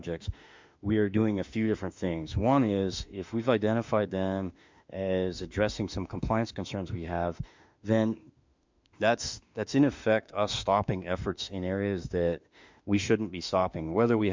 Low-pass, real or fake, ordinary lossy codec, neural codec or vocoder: 7.2 kHz; fake; MP3, 64 kbps; autoencoder, 48 kHz, 32 numbers a frame, DAC-VAE, trained on Japanese speech